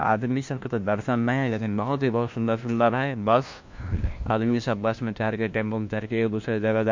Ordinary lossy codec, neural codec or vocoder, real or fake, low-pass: MP3, 48 kbps; codec, 16 kHz, 1 kbps, FunCodec, trained on LibriTTS, 50 frames a second; fake; 7.2 kHz